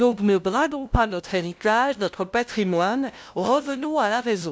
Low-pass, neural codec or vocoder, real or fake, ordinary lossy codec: none; codec, 16 kHz, 0.5 kbps, FunCodec, trained on LibriTTS, 25 frames a second; fake; none